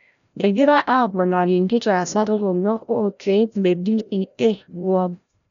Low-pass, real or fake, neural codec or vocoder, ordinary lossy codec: 7.2 kHz; fake; codec, 16 kHz, 0.5 kbps, FreqCodec, larger model; none